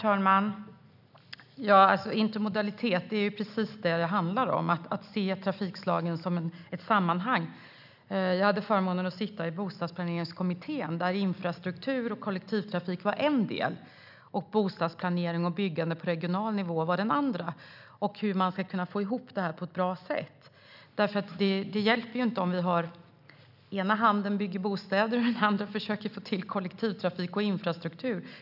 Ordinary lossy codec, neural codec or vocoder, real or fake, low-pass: none; none; real; 5.4 kHz